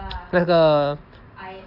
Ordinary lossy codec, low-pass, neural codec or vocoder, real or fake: none; 5.4 kHz; none; real